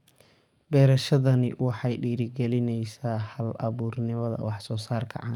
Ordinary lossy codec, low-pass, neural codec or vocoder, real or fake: none; 19.8 kHz; codec, 44.1 kHz, 7.8 kbps, DAC; fake